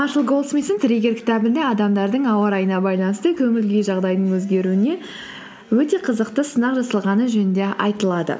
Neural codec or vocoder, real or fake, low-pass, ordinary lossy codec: none; real; none; none